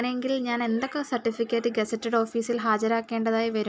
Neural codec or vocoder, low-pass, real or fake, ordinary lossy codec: none; none; real; none